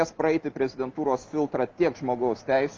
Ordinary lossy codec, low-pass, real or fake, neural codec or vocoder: Opus, 32 kbps; 7.2 kHz; real; none